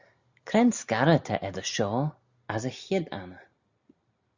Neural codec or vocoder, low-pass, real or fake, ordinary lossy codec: none; 7.2 kHz; real; Opus, 64 kbps